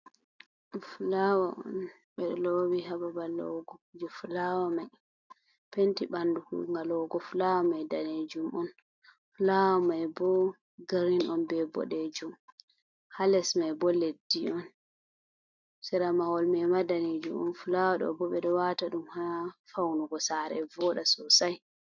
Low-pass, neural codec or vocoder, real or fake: 7.2 kHz; none; real